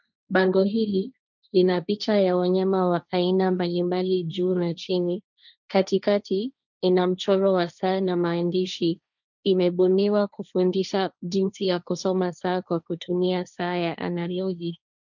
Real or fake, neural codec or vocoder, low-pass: fake; codec, 16 kHz, 1.1 kbps, Voila-Tokenizer; 7.2 kHz